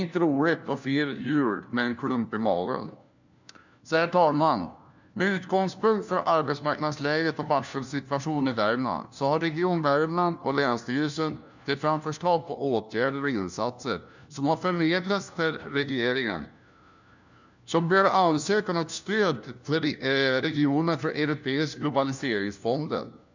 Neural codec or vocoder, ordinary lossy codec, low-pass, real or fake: codec, 16 kHz, 1 kbps, FunCodec, trained on LibriTTS, 50 frames a second; none; 7.2 kHz; fake